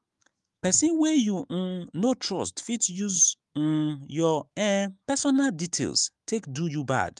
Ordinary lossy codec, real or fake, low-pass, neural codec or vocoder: Opus, 32 kbps; fake; 10.8 kHz; autoencoder, 48 kHz, 128 numbers a frame, DAC-VAE, trained on Japanese speech